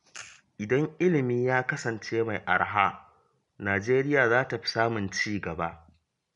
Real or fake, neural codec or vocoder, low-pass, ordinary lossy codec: real; none; 10.8 kHz; MP3, 64 kbps